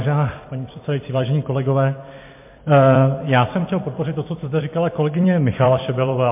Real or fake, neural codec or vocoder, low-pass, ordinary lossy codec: fake; vocoder, 44.1 kHz, 128 mel bands every 256 samples, BigVGAN v2; 3.6 kHz; MP3, 24 kbps